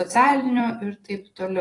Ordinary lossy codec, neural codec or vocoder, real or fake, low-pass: AAC, 32 kbps; vocoder, 44.1 kHz, 128 mel bands every 256 samples, BigVGAN v2; fake; 10.8 kHz